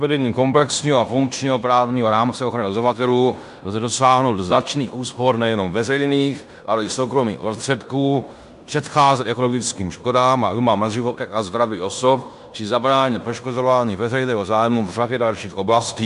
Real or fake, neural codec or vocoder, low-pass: fake; codec, 16 kHz in and 24 kHz out, 0.9 kbps, LongCat-Audio-Codec, fine tuned four codebook decoder; 10.8 kHz